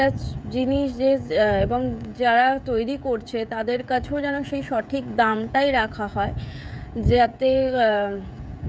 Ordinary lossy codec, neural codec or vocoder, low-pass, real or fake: none; codec, 16 kHz, 16 kbps, FreqCodec, smaller model; none; fake